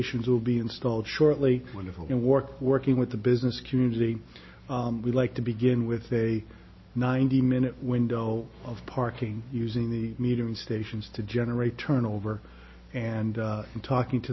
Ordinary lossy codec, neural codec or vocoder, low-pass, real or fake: MP3, 24 kbps; none; 7.2 kHz; real